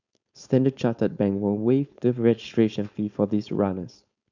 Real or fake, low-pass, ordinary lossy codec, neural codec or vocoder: fake; 7.2 kHz; none; codec, 16 kHz, 4.8 kbps, FACodec